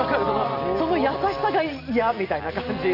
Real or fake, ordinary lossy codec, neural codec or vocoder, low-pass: real; none; none; 5.4 kHz